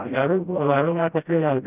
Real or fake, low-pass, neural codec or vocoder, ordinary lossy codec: fake; 3.6 kHz; codec, 16 kHz, 0.5 kbps, FreqCodec, smaller model; none